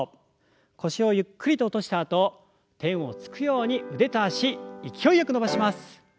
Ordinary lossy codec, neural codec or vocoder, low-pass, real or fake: none; none; none; real